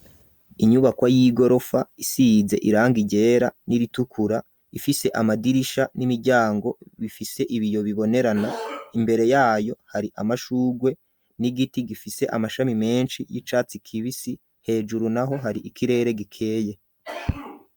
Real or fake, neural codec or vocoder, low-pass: real; none; 19.8 kHz